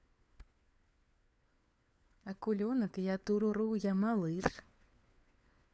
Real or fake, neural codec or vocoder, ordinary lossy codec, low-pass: fake; codec, 16 kHz, 4 kbps, FunCodec, trained on LibriTTS, 50 frames a second; none; none